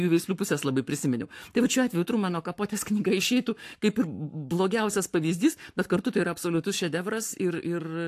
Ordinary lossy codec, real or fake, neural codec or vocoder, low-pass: AAC, 64 kbps; fake; codec, 44.1 kHz, 7.8 kbps, Pupu-Codec; 14.4 kHz